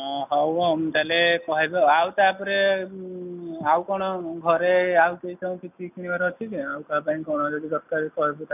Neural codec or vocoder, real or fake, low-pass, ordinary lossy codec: none; real; 3.6 kHz; none